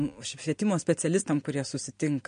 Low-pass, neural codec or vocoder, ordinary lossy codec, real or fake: 9.9 kHz; none; MP3, 48 kbps; real